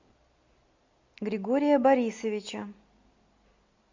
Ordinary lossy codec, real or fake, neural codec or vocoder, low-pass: MP3, 64 kbps; real; none; 7.2 kHz